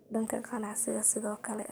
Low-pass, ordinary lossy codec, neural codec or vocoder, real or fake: none; none; codec, 44.1 kHz, 7.8 kbps, DAC; fake